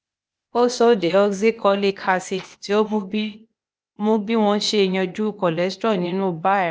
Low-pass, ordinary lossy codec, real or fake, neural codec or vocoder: none; none; fake; codec, 16 kHz, 0.8 kbps, ZipCodec